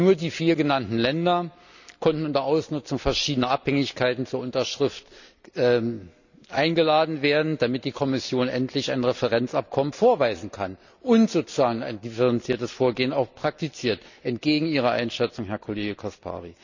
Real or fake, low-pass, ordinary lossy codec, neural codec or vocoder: real; 7.2 kHz; none; none